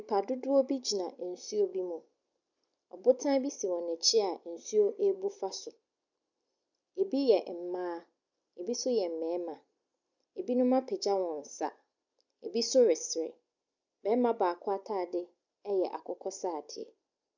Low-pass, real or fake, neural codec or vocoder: 7.2 kHz; real; none